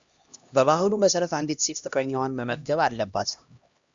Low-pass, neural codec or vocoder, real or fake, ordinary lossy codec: 7.2 kHz; codec, 16 kHz, 1 kbps, X-Codec, HuBERT features, trained on LibriSpeech; fake; Opus, 64 kbps